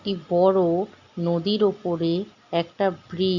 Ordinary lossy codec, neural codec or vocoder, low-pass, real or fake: Opus, 64 kbps; none; 7.2 kHz; real